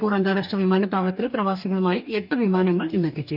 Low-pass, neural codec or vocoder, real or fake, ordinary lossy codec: 5.4 kHz; codec, 44.1 kHz, 2.6 kbps, DAC; fake; none